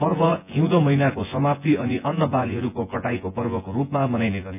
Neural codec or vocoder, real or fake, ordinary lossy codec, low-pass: vocoder, 24 kHz, 100 mel bands, Vocos; fake; none; 3.6 kHz